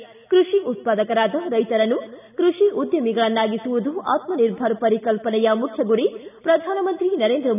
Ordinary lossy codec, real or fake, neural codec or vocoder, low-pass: none; real; none; 3.6 kHz